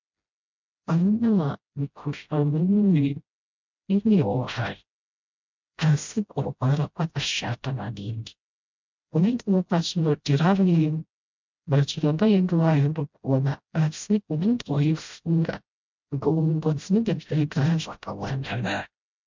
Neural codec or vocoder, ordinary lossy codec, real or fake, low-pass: codec, 16 kHz, 0.5 kbps, FreqCodec, smaller model; MP3, 64 kbps; fake; 7.2 kHz